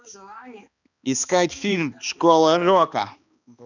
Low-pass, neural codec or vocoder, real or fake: 7.2 kHz; codec, 16 kHz, 2 kbps, X-Codec, HuBERT features, trained on balanced general audio; fake